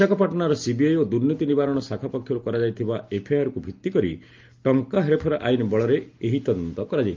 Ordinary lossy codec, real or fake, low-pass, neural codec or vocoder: Opus, 24 kbps; real; 7.2 kHz; none